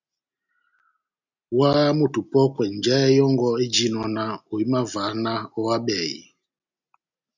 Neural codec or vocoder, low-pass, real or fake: none; 7.2 kHz; real